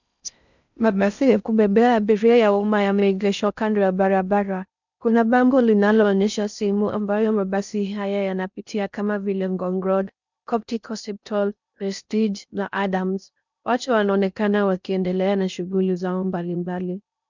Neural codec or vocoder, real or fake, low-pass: codec, 16 kHz in and 24 kHz out, 0.6 kbps, FocalCodec, streaming, 4096 codes; fake; 7.2 kHz